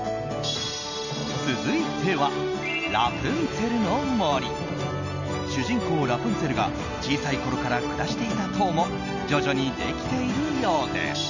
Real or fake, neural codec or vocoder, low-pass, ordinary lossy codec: real; none; 7.2 kHz; none